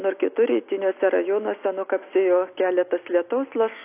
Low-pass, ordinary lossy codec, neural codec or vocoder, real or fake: 3.6 kHz; AAC, 24 kbps; none; real